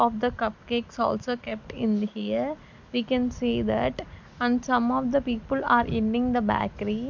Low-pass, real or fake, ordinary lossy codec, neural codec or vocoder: 7.2 kHz; real; MP3, 64 kbps; none